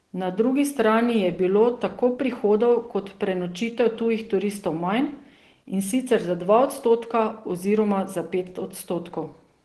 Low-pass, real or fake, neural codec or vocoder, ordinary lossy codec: 10.8 kHz; real; none; Opus, 16 kbps